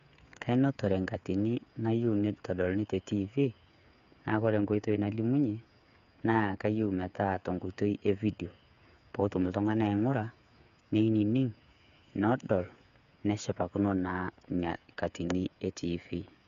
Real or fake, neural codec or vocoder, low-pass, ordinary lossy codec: fake; codec, 16 kHz, 8 kbps, FreqCodec, smaller model; 7.2 kHz; none